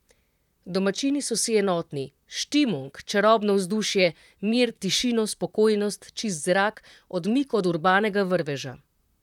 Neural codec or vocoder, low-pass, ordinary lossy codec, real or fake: vocoder, 44.1 kHz, 128 mel bands, Pupu-Vocoder; 19.8 kHz; none; fake